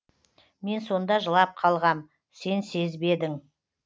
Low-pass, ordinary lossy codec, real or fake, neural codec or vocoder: none; none; real; none